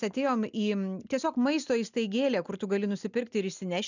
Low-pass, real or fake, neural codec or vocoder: 7.2 kHz; real; none